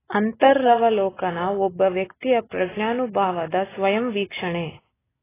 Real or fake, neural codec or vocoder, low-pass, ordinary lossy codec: real; none; 3.6 kHz; AAC, 16 kbps